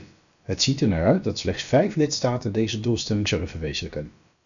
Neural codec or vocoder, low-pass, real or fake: codec, 16 kHz, about 1 kbps, DyCAST, with the encoder's durations; 7.2 kHz; fake